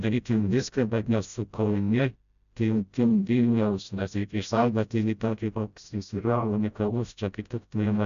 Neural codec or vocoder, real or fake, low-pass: codec, 16 kHz, 0.5 kbps, FreqCodec, smaller model; fake; 7.2 kHz